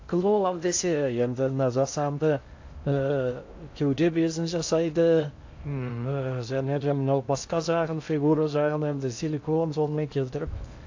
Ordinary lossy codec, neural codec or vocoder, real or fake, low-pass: AAC, 48 kbps; codec, 16 kHz in and 24 kHz out, 0.6 kbps, FocalCodec, streaming, 2048 codes; fake; 7.2 kHz